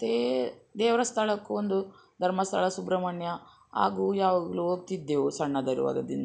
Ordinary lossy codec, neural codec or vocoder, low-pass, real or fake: none; none; none; real